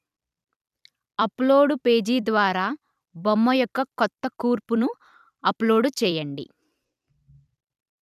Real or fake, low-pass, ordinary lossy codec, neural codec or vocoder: fake; 14.4 kHz; none; vocoder, 44.1 kHz, 128 mel bands every 256 samples, BigVGAN v2